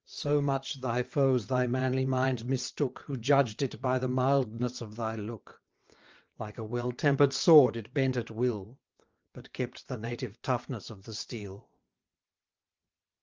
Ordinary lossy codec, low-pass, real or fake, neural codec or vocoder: Opus, 24 kbps; 7.2 kHz; fake; vocoder, 22.05 kHz, 80 mel bands, WaveNeXt